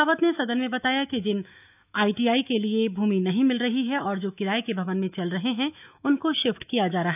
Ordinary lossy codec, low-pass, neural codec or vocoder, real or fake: none; 3.6 kHz; autoencoder, 48 kHz, 128 numbers a frame, DAC-VAE, trained on Japanese speech; fake